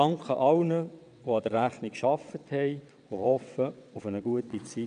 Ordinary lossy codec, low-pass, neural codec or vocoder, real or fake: none; 9.9 kHz; vocoder, 22.05 kHz, 80 mel bands, WaveNeXt; fake